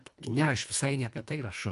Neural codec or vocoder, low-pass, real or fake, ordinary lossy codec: codec, 24 kHz, 1.5 kbps, HILCodec; 10.8 kHz; fake; MP3, 96 kbps